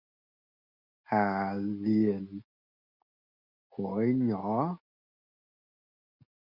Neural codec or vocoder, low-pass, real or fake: none; 5.4 kHz; real